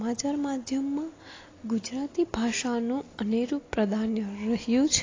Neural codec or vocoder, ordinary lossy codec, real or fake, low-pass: none; AAC, 32 kbps; real; 7.2 kHz